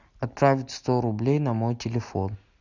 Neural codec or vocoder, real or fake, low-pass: none; real; 7.2 kHz